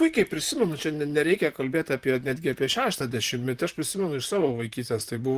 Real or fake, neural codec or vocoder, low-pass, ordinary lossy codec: fake; vocoder, 44.1 kHz, 128 mel bands, Pupu-Vocoder; 14.4 kHz; Opus, 24 kbps